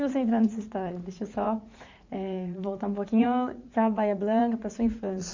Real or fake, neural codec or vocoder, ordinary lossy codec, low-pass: fake; vocoder, 44.1 kHz, 80 mel bands, Vocos; MP3, 64 kbps; 7.2 kHz